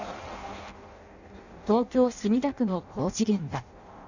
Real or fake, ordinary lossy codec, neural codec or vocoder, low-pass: fake; none; codec, 16 kHz in and 24 kHz out, 0.6 kbps, FireRedTTS-2 codec; 7.2 kHz